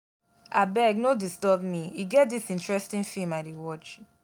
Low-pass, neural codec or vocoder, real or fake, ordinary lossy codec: none; none; real; none